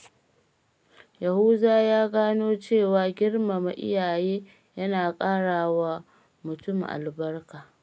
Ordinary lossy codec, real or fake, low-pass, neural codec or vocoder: none; real; none; none